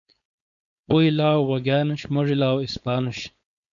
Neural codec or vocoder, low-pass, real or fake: codec, 16 kHz, 4.8 kbps, FACodec; 7.2 kHz; fake